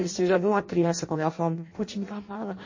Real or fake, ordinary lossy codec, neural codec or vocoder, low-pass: fake; MP3, 32 kbps; codec, 16 kHz in and 24 kHz out, 0.6 kbps, FireRedTTS-2 codec; 7.2 kHz